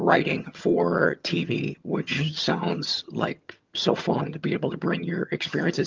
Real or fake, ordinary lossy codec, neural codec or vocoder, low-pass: fake; Opus, 32 kbps; vocoder, 22.05 kHz, 80 mel bands, HiFi-GAN; 7.2 kHz